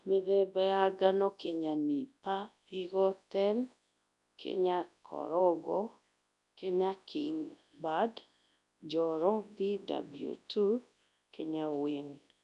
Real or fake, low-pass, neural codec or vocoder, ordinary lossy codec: fake; 10.8 kHz; codec, 24 kHz, 0.9 kbps, WavTokenizer, large speech release; none